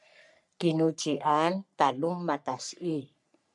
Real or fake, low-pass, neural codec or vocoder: fake; 10.8 kHz; codec, 44.1 kHz, 3.4 kbps, Pupu-Codec